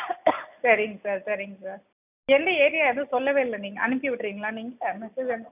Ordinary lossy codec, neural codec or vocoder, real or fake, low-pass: none; none; real; 3.6 kHz